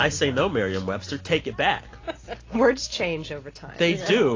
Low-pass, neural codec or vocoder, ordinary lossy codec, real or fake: 7.2 kHz; none; AAC, 32 kbps; real